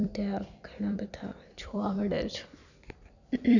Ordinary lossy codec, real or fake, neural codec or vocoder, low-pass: none; fake; vocoder, 44.1 kHz, 128 mel bands every 256 samples, BigVGAN v2; 7.2 kHz